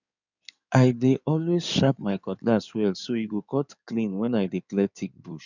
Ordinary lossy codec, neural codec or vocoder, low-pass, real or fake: none; codec, 16 kHz in and 24 kHz out, 2.2 kbps, FireRedTTS-2 codec; 7.2 kHz; fake